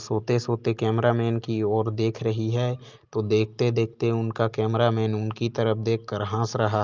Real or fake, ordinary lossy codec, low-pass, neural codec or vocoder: real; Opus, 24 kbps; 7.2 kHz; none